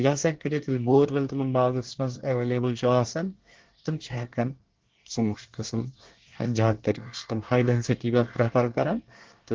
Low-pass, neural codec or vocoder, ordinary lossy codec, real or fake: 7.2 kHz; codec, 24 kHz, 1 kbps, SNAC; Opus, 16 kbps; fake